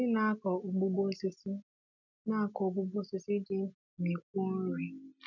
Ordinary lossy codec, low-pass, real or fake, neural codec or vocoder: none; 7.2 kHz; real; none